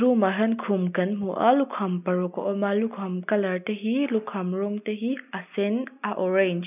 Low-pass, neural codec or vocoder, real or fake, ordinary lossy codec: 3.6 kHz; none; real; none